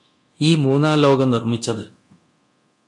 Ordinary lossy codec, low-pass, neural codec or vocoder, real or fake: MP3, 48 kbps; 10.8 kHz; codec, 24 kHz, 0.9 kbps, DualCodec; fake